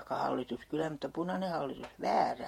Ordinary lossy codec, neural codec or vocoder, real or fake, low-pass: MP3, 64 kbps; vocoder, 48 kHz, 128 mel bands, Vocos; fake; 19.8 kHz